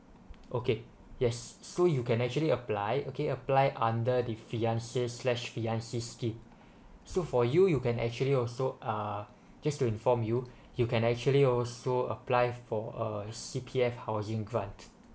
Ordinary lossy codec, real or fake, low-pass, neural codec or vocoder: none; real; none; none